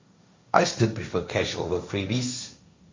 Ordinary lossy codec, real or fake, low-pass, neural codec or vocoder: MP3, 48 kbps; fake; 7.2 kHz; codec, 16 kHz, 1.1 kbps, Voila-Tokenizer